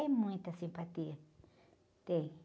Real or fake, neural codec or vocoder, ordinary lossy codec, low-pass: real; none; none; none